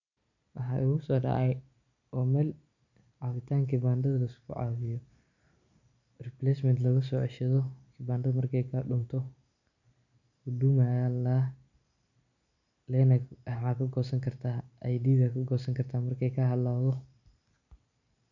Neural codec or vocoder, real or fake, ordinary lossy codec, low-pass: none; real; none; 7.2 kHz